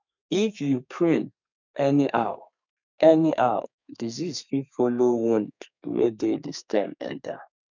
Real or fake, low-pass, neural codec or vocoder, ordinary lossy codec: fake; 7.2 kHz; codec, 32 kHz, 1.9 kbps, SNAC; none